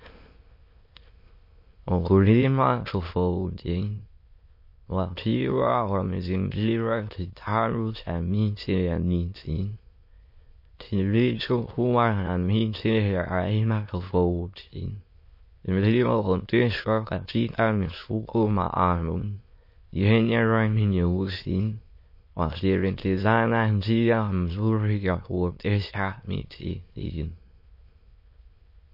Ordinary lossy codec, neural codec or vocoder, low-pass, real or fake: MP3, 32 kbps; autoencoder, 22.05 kHz, a latent of 192 numbers a frame, VITS, trained on many speakers; 5.4 kHz; fake